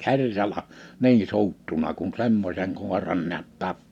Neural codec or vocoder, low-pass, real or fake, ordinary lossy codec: none; 19.8 kHz; real; none